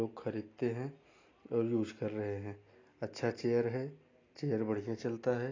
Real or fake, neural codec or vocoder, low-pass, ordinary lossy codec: real; none; 7.2 kHz; AAC, 32 kbps